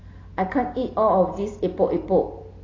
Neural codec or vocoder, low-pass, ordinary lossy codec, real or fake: none; 7.2 kHz; AAC, 32 kbps; real